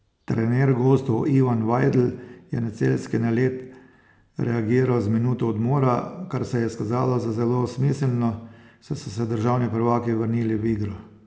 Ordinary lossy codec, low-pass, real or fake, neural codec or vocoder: none; none; real; none